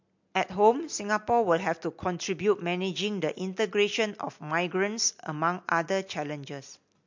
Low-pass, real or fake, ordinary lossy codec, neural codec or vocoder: 7.2 kHz; real; MP3, 48 kbps; none